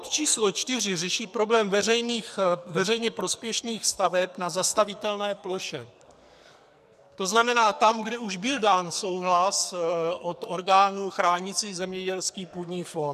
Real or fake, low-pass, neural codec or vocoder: fake; 14.4 kHz; codec, 44.1 kHz, 2.6 kbps, SNAC